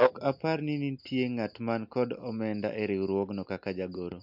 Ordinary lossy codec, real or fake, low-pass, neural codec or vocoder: MP3, 48 kbps; real; 5.4 kHz; none